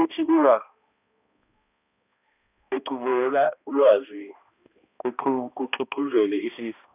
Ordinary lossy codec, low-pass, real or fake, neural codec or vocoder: none; 3.6 kHz; fake; codec, 16 kHz, 1 kbps, X-Codec, HuBERT features, trained on balanced general audio